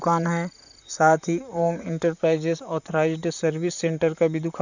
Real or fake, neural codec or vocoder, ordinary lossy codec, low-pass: fake; vocoder, 44.1 kHz, 128 mel bands, Pupu-Vocoder; none; 7.2 kHz